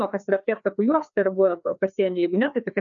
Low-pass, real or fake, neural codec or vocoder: 7.2 kHz; fake; codec, 16 kHz, 2 kbps, FreqCodec, larger model